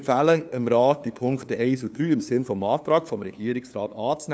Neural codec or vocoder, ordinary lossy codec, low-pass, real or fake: codec, 16 kHz, 2 kbps, FunCodec, trained on LibriTTS, 25 frames a second; none; none; fake